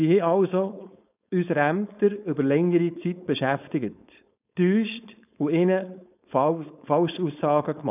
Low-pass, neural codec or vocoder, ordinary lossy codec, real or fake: 3.6 kHz; codec, 16 kHz, 4.8 kbps, FACodec; none; fake